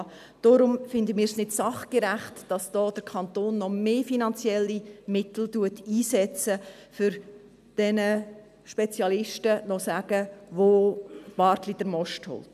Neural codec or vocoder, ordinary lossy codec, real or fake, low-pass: none; none; real; 14.4 kHz